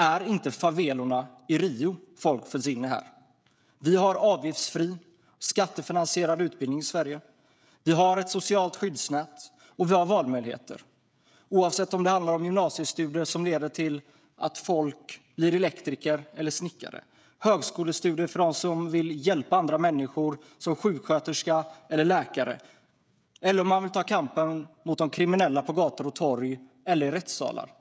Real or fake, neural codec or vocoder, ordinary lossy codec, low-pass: fake; codec, 16 kHz, 16 kbps, FreqCodec, smaller model; none; none